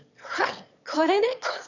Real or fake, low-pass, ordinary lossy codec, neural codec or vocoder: fake; 7.2 kHz; none; autoencoder, 22.05 kHz, a latent of 192 numbers a frame, VITS, trained on one speaker